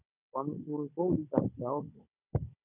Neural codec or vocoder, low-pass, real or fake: codec, 16 kHz, 16 kbps, FunCodec, trained on Chinese and English, 50 frames a second; 3.6 kHz; fake